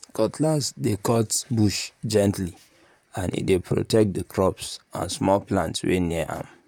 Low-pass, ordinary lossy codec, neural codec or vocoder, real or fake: 19.8 kHz; none; vocoder, 44.1 kHz, 128 mel bands, Pupu-Vocoder; fake